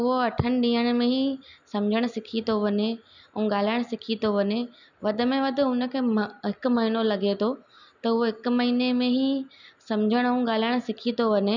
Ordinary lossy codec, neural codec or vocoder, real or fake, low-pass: none; none; real; 7.2 kHz